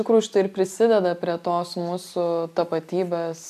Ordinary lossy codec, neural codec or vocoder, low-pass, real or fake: AAC, 96 kbps; none; 14.4 kHz; real